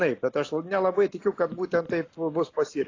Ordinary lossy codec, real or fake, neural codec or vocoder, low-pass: AAC, 32 kbps; real; none; 7.2 kHz